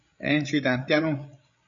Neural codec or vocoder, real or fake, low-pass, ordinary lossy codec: codec, 16 kHz, 8 kbps, FreqCodec, larger model; fake; 7.2 kHz; AAC, 64 kbps